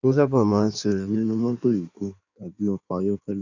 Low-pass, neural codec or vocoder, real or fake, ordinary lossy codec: 7.2 kHz; codec, 16 kHz in and 24 kHz out, 1.1 kbps, FireRedTTS-2 codec; fake; none